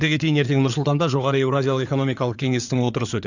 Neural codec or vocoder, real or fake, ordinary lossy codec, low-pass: codec, 16 kHz in and 24 kHz out, 2.2 kbps, FireRedTTS-2 codec; fake; none; 7.2 kHz